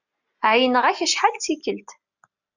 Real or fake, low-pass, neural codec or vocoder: real; 7.2 kHz; none